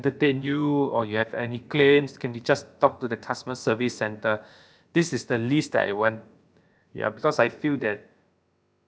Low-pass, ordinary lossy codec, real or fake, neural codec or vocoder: none; none; fake; codec, 16 kHz, about 1 kbps, DyCAST, with the encoder's durations